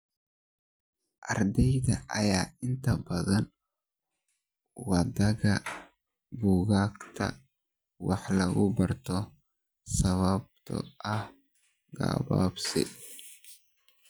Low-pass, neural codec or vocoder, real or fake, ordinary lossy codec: none; none; real; none